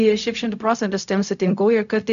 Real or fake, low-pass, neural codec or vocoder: fake; 7.2 kHz; codec, 16 kHz, 0.4 kbps, LongCat-Audio-Codec